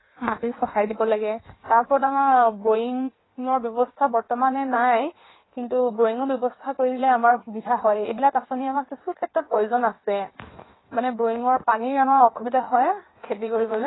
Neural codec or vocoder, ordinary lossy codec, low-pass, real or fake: codec, 16 kHz in and 24 kHz out, 1.1 kbps, FireRedTTS-2 codec; AAC, 16 kbps; 7.2 kHz; fake